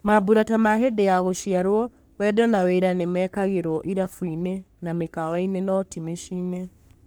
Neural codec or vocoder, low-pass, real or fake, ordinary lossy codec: codec, 44.1 kHz, 3.4 kbps, Pupu-Codec; none; fake; none